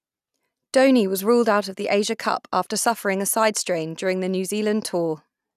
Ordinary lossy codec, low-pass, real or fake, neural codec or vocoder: none; 14.4 kHz; real; none